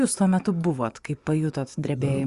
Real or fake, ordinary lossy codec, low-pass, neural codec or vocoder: fake; Opus, 64 kbps; 10.8 kHz; vocoder, 24 kHz, 100 mel bands, Vocos